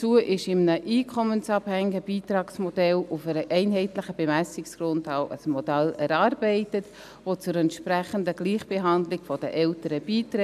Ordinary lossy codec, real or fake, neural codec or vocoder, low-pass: none; real; none; 14.4 kHz